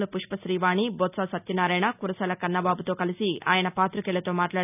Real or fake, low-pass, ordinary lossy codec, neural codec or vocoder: real; 3.6 kHz; none; none